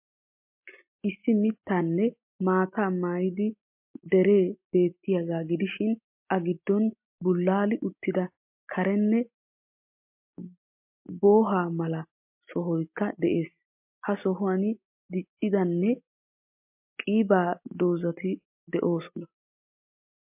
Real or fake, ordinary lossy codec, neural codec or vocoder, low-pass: real; MP3, 32 kbps; none; 3.6 kHz